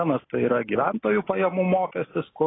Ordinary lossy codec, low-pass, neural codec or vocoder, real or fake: AAC, 16 kbps; 7.2 kHz; none; real